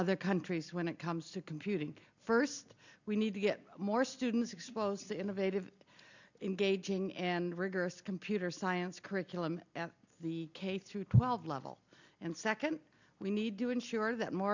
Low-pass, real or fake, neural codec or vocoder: 7.2 kHz; real; none